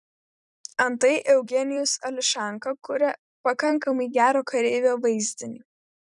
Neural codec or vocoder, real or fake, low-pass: vocoder, 44.1 kHz, 128 mel bands every 256 samples, BigVGAN v2; fake; 10.8 kHz